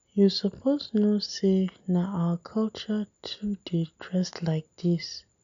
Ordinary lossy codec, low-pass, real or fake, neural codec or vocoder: none; 7.2 kHz; real; none